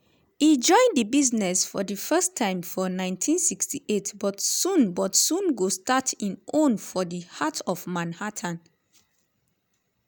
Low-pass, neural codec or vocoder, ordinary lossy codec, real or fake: none; none; none; real